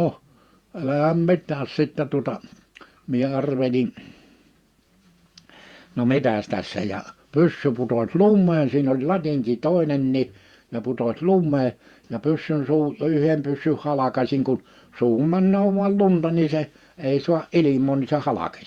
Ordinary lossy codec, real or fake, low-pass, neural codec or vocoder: Opus, 64 kbps; fake; 19.8 kHz; vocoder, 48 kHz, 128 mel bands, Vocos